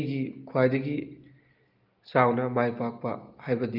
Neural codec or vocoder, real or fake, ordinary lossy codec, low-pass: none; real; Opus, 24 kbps; 5.4 kHz